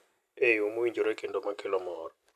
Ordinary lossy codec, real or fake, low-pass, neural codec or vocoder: none; real; 14.4 kHz; none